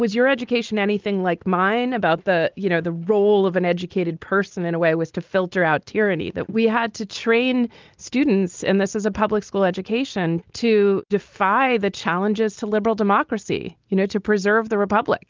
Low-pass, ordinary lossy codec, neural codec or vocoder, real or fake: 7.2 kHz; Opus, 32 kbps; none; real